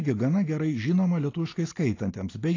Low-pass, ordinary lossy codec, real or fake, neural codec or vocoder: 7.2 kHz; AAC, 32 kbps; real; none